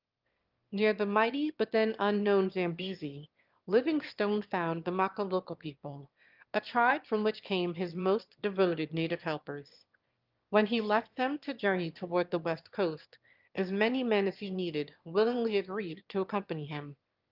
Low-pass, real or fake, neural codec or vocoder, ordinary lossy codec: 5.4 kHz; fake; autoencoder, 22.05 kHz, a latent of 192 numbers a frame, VITS, trained on one speaker; Opus, 32 kbps